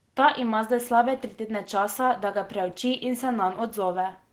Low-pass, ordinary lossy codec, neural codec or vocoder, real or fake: 19.8 kHz; Opus, 16 kbps; none; real